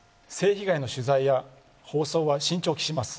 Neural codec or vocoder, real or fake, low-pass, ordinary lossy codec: none; real; none; none